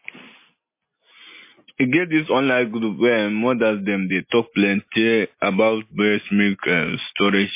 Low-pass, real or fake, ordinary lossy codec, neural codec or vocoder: 3.6 kHz; real; MP3, 24 kbps; none